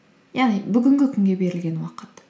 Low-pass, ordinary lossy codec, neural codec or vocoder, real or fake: none; none; none; real